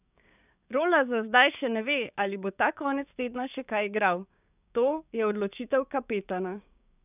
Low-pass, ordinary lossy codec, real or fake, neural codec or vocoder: 3.6 kHz; none; fake; codec, 16 kHz, 6 kbps, DAC